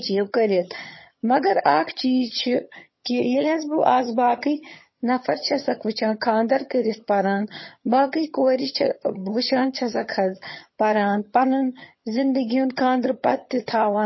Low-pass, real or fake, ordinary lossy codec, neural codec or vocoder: 7.2 kHz; fake; MP3, 24 kbps; vocoder, 22.05 kHz, 80 mel bands, HiFi-GAN